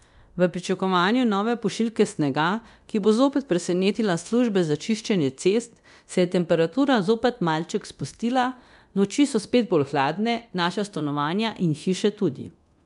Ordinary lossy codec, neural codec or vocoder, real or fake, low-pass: none; codec, 24 kHz, 0.9 kbps, DualCodec; fake; 10.8 kHz